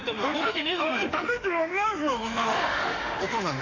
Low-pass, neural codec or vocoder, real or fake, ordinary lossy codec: 7.2 kHz; autoencoder, 48 kHz, 32 numbers a frame, DAC-VAE, trained on Japanese speech; fake; none